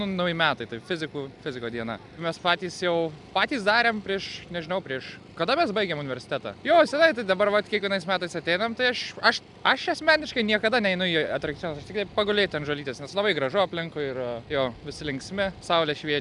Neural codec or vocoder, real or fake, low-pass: none; real; 10.8 kHz